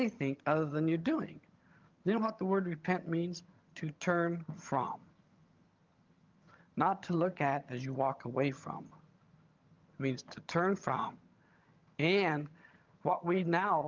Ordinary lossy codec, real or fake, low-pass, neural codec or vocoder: Opus, 32 kbps; fake; 7.2 kHz; vocoder, 22.05 kHz, 80 mel bands, HiFi-GAN